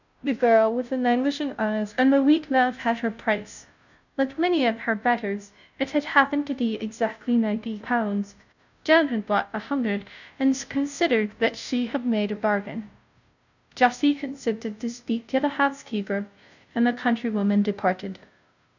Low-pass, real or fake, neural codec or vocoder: 7.2 kHz; fake; codec, 16 kHz, 0.5 kbps, FunCodec, trained on Chinese and English, 25 frames a second